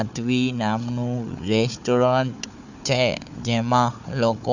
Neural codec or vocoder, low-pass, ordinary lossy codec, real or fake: codec, 16 kHz, 4 kbps, FunCodec, trained on Chinese and English, 50 frames a second; 7.2 kHz; none; fake